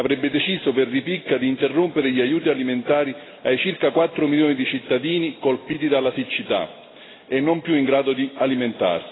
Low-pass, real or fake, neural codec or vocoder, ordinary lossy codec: 7.2 kHz; real; none; AAC, 16 kbps